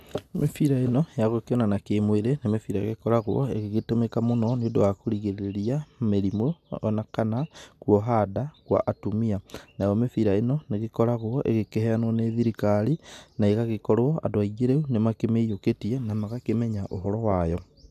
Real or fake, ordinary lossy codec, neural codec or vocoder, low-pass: real; none; none; 14.4 kHz